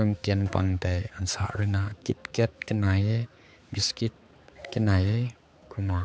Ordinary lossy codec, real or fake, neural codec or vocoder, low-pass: none; fake; codec, 16 kHz, 4 kbps, X-Codec, HuBERT features, trained on balanced general audio; none